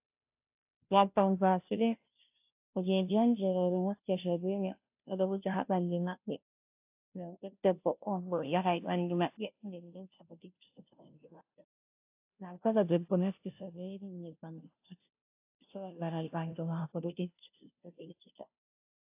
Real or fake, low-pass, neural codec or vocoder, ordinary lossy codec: fake; 3.6 kHz; codec, 16 kHz, 0.5 kbps, FunCodec, trained on Chinese and English, 25 frames a second; AAC, 32 kbps